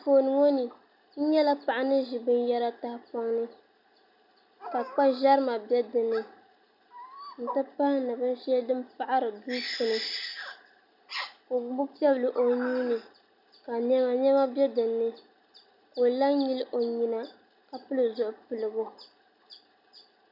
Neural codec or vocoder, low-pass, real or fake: none; 5.4 kHz; real